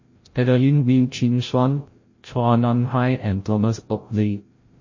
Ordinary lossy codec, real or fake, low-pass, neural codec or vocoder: MP3, 32 kbps; fake; 7.2 kHz; codec, 16 kHz, 0.5 kbps, FreqCodec, larger model